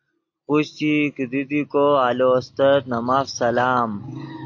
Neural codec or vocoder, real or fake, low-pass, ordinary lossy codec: none; real; 7.2 kHz; AAC, 48 kbps